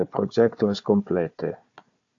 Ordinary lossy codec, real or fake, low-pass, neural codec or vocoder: AAC, 48 kbps; fake; 7.2 kHz; codec, 16 kHz, 4 kbps, FunCodec, trained on Chinese and English, 50 frames a second